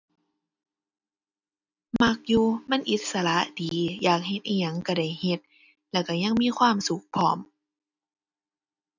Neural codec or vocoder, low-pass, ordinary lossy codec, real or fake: none; 7.2 kHz; none; real